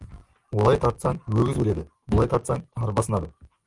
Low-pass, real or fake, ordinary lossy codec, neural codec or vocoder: 10.8 kHz; real; Opus, 32 kbps; none